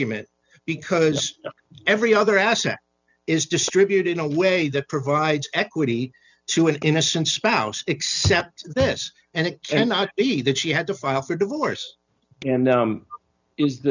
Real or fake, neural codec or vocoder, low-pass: real; none; 7.2 kHz